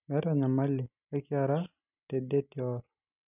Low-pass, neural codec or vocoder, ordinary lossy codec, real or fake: 3.6 kHz; none; none; real